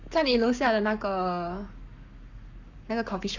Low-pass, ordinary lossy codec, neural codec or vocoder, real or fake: 7.2 kHz; none; codec, 44.1 kHz, 7.8 kbps, Pupu-Codec; fake